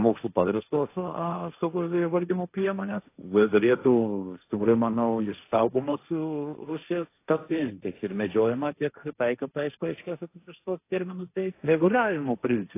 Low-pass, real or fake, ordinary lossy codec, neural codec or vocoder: 3.6 kHz; fake; AAC, 24 kbps; codec, 16 kHz, 1.1 kbps, Voila-Tokenizer